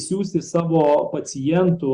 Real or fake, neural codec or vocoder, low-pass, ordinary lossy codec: real; none; 9.9 kHz; Opus, 64 kbps